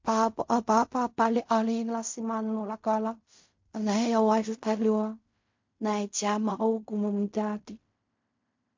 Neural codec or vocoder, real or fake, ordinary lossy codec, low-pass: codec, 16 kHz in and 24 kHz out, 0.4 kbps, LongCat-Audio-Codec, fine tuned four codebook decoder; fake; MP3, 48 kbps; 7.2 kHz